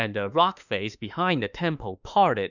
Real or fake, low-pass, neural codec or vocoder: fake; 7.2 kHz; autoencoder, 48 kHz, 32 numbers a frame, DAC-VAE, trained on Japanese speech